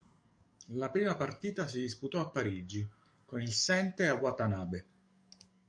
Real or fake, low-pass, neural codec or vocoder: fake; 9.9 kHz; codec, 44.1 kHz, 7.8 kbps, DAC